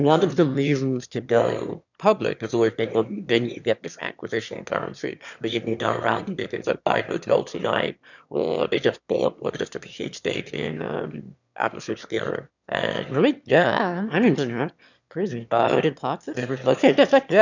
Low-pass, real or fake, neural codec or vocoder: 7.2 kHz; fake; autoencoder, 22.05 kHz, a latent of 192 numbers a frame, VITS, trained on one speaker